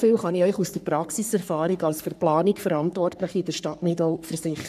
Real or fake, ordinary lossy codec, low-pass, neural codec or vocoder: fake; none; 14.4 kHz; codec, 44.1 kHz, 3.4 kbps, Pupu-Codec